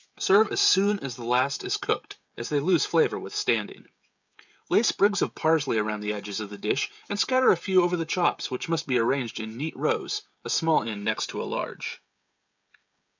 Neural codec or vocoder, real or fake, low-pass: codec, 16 kHz, 16 kbps, FreqCodec, smaller model; fake; 7.2 kHz